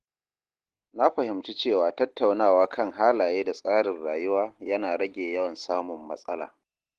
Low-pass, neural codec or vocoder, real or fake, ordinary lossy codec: 10.8 kHz; none; real; Opus, 16 kbps